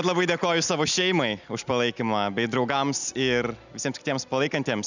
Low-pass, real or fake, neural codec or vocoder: 7.2 kHz; real; none